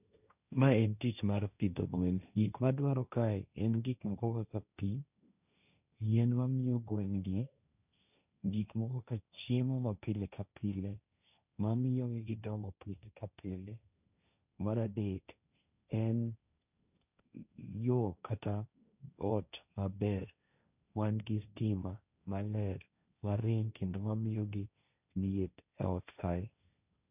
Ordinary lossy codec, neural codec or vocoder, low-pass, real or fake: none; codec, 16 kHz, 1.1 kbps, Voila-Tokenizer; 3.6 kHz; fake